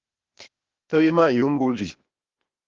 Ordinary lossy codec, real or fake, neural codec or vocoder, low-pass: Opus, 32 kbps; fake; codec, 16 kHz, 0.8 kbps, ZipCodec; 7.2 kHz